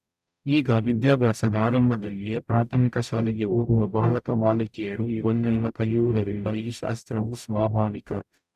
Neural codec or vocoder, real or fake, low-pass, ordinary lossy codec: codec, 44.1 kHz, 0.9 kbps, DAC; fake; 14.4 kHz; none